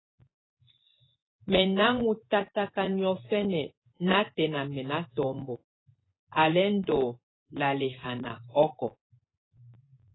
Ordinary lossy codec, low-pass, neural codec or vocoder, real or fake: AAC, 16 kbps; 7.2 kHz; vocoder, 24 kHz, 100 mel bands, Vocos; fake